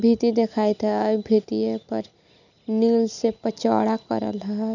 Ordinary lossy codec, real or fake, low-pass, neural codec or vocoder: none; real; 7.2 kHz; none